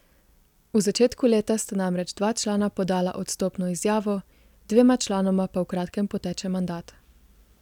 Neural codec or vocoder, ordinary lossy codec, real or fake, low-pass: none; none; real; 19.8 kHz